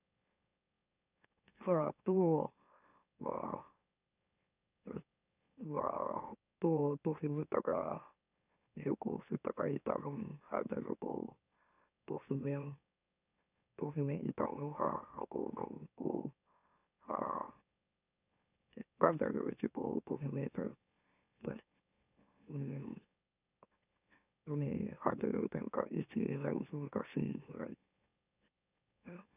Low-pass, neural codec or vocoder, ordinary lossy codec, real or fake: 3.6 kHz; autoencoder, 44.1 kHz, a latent of 192 numbers a frame, MeloTTS; none; fake